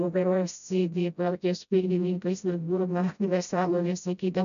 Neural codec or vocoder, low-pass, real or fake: codec, 16 kHz, 0.5 kbps, FreqCodec, smaller model; 7.2 kHz; fake